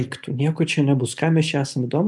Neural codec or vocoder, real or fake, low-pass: none; real; 10.8 kHz